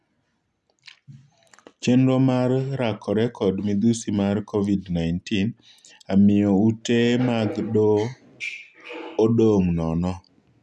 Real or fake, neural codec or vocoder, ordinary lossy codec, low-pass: real; none; none; none